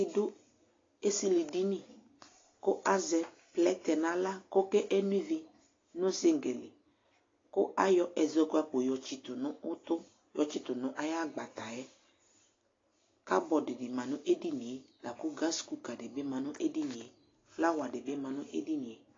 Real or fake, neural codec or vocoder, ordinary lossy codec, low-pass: real; none; AAC, 32 kbps; 7.2 kHz